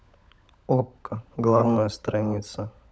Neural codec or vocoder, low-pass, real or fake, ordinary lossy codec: codec, 16 kHz, 16 kbps, FunCodec, trained on LibriTTS, 50 frames a second; none; fake; none